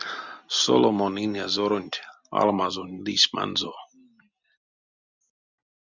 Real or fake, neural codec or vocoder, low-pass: real; none; 7.2 kHz